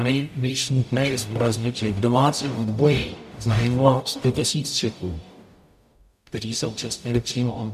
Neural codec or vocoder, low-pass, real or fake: codec, 44.1 kHz, 0.9 kbps, DAC; 14.4 kHz; fake